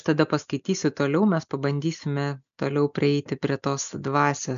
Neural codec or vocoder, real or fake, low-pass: none; real; 7.2 kHz